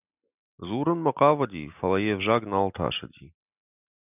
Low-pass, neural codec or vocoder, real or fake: 3.6 kHz; none; real